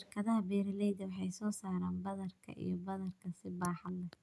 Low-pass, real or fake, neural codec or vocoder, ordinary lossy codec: none; real; none; none